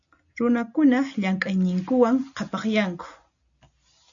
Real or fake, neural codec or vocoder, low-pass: real; none; 7.2 kHz